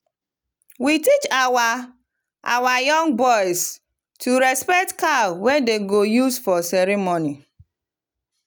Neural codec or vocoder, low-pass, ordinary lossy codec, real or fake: none; none; none; real